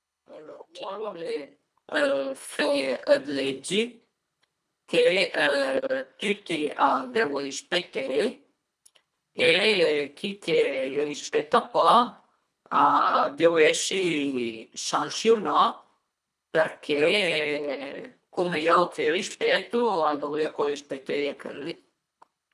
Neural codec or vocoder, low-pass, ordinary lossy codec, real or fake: codec, 24 kHz, 1.5 kbps, HILCodec; none; none; fake